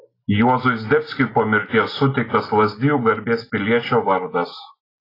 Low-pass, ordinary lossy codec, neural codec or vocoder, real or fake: 5.4 kHz; AAC, 24 kbps; none; real